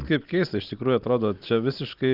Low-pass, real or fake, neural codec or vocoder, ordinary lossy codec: 5.4 kHz; real; none; Opus, 24 kbps